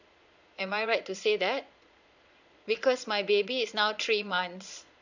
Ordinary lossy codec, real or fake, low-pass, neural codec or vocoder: none; fake; 7.2 kHz; vocoder, 22.05 kHz, 80 mel bands, Vocos